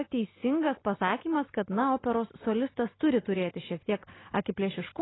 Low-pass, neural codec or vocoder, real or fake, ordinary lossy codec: 7.2 kHz; none; real; AAC, 16 kbps